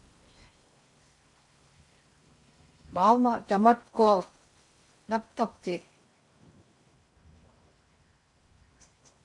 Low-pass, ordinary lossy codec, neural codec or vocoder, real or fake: 10.8 kHz; MP3, 48 kbps; codec, 16 kHz in and 24 kHz out, 0.6 kbps, FocalCodec, streaming, 4096 codes; fake